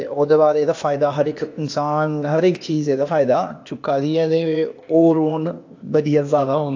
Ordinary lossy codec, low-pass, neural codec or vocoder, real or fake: AAC, 48 kbps; 7.2 kHz; codec, 16 kHz, 0.8 kbps, ZipCodec; fake